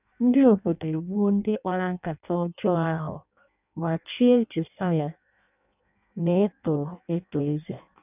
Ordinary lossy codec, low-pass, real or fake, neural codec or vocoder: none; 3.6 kHz; fake; codec, 16 kHz in and 24 kHz out, 0.6 kbps, FireRedTTS-2 codec